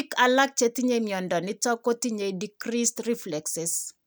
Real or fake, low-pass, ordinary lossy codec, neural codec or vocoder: real; none; none; none